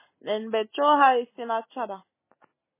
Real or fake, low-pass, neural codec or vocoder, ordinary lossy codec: real; 3.6 kHz; none; MP3, 16 kbps